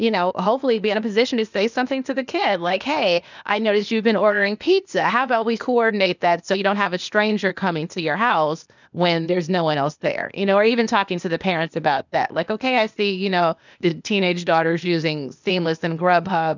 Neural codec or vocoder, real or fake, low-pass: codec, 16 kHz, 0.8 kbps, ZipCodec; fake; 7.2 kHz